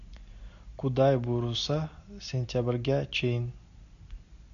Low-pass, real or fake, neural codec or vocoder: 7.2 kHz; real; none